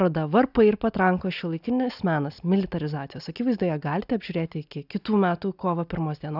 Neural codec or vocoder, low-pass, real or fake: none; 5.4 kHz; real